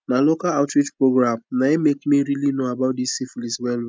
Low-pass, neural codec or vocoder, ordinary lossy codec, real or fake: none; none; none; real